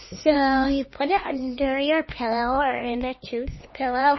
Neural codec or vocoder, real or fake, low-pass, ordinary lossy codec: codec, 16 kHz, 2 kbps, X-Codec, HuBERT features, trained on LibriSpeech; fake; 7.2 kHz; MP3, 24 kbps